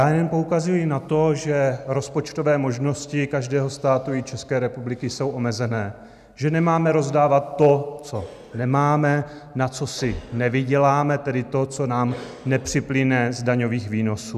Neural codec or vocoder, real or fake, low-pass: none; real; 14.4 kHz